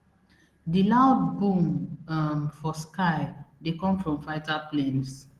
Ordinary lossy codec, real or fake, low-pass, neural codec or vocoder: Opus, 16 kbps; real; 14.4 kHz; none